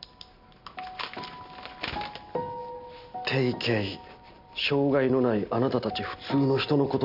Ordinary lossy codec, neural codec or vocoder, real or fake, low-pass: none; none; real; 5.4 kHz